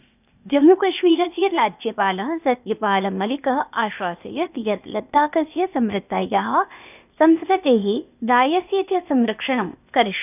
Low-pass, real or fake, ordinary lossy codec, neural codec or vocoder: 3.6 kHz; fake; none; codec, 16 kHz, 0.8 kbps, ZipCodec